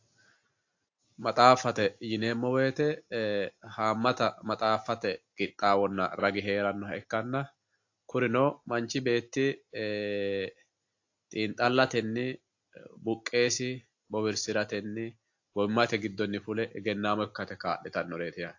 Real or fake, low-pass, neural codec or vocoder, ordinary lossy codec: real; 7.2 kHz; none; AAC, 48 kbps